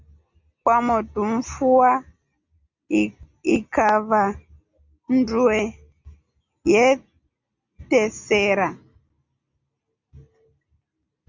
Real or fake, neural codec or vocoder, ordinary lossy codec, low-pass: real; none; Opus, 64 kbps; 7.2 kHz